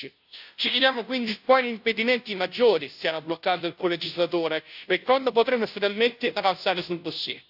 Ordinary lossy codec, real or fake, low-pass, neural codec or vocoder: AAC, 48 kbps; fake; 5.4 kHz; codec, 16 kHz, 0.5 kbps, FunCodec, trained on Chinese and English, 25 frames a second